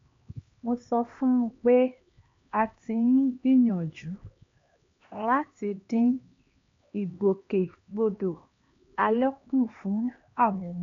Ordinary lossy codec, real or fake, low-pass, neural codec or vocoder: MP3, 48 kbps; fake; 7.2 kHz; codec, 16 kHz, 2 kbps, X-Codec, HuBERT features, trained on LibriSpeech